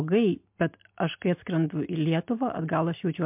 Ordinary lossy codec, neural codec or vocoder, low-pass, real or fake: MP3, 32 kbps; none; 3.6 kHz; real